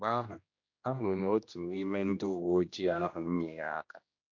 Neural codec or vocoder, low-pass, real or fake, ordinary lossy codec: codec, 16 kHz, 1 kbps, X-Codec, HuBERT features, trained on general audio; 7.2 kHz; fake; AAC, 48 kbps